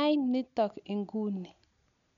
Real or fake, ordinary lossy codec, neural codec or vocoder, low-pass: real; none; none; 7.2 kHz